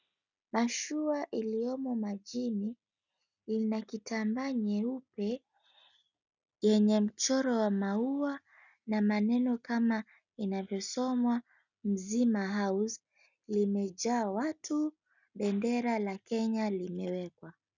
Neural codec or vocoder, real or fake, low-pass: none; real; 7.2 kHz